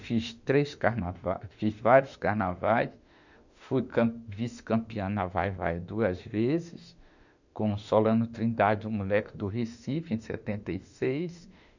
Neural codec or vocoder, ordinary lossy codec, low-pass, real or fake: autoencoder, 48 kHz, 32 numbers a frame, DAC-VAE, trained on Japanese speech; none; 7.2 kHz; fake